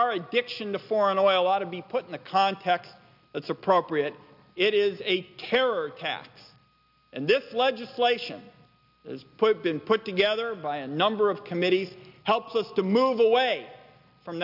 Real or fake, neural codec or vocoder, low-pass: real; none; 5.4 kHz